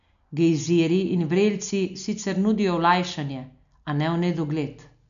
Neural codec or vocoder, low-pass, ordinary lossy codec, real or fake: none; 7.2 kHz; MP3, 96 kbps; real